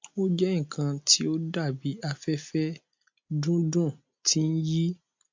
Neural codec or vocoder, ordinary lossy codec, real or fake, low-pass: none; MP3, 48 kbps; real; 7.2 kHz